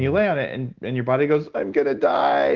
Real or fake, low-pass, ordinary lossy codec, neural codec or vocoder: real; 7.2 kHz; Opus, 16 kbps; none